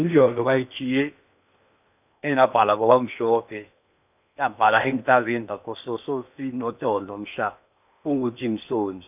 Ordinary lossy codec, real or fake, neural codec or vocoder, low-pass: none; fake; codec, 16 kHz in and 24 kHz out, 0.8 kbps, FocalCodec, streaming, 65536 codes; 3.6 kHz